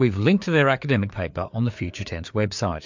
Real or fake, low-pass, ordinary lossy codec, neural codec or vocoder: fake; 7.2 kHz; MP3, 64 kbps; codec, 16 kHz, 4 kbps, FreqCodec, larger model